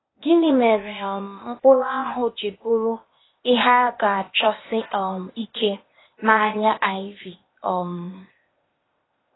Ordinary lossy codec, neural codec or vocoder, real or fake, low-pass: AAC, 16 kbps; codec, 16 kHz, 0.8 kbps, ZipCodec; fake; 7.2 kHz